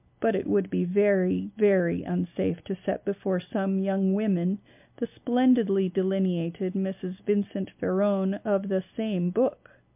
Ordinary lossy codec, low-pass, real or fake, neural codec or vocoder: MP3, 32 kbps; 3.6 kHz; real; none